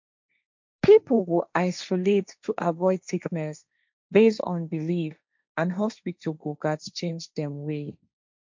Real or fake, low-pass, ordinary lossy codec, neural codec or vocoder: fake; 7.2 kHz; MP3, 64 kbps; codec, 16 kHz, 1.1 kbps, Voila-Tokenizer